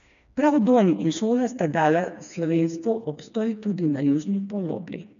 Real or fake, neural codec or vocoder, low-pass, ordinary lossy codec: fake; codec, 16 kHz, 2 kbps, FreqCodec, smaller model; 7.2 kHz; none